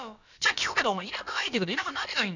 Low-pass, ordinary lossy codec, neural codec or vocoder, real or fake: 7.2 kHz; none; codec, 16 kHz, about 1 kbps, DyCAST, with the encoder's durations; fake